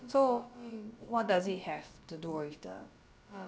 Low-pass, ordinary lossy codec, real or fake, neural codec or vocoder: none; none; fake; codec, 16 kHz, about 1 kbps, DyCAST, with the encoder's durations